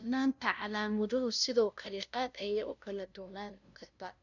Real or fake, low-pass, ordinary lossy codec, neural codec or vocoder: fake; 7.2 kHz; none; codec, 16 kHz, 0.5 kbps, FunCodec, trained on LibriTTS, 25 frames a second